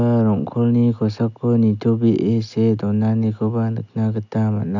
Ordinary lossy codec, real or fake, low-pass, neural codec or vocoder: none; real; 7.2 kHz; none